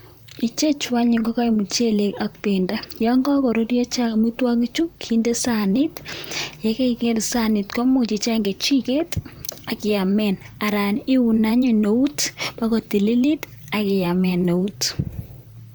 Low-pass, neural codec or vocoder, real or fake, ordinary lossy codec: none; vocoder, 44.1 kHz, 128 mel bands, Pupu-Vocoder; fake; none